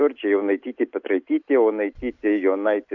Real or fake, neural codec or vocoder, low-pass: real; none; 7.2 kHz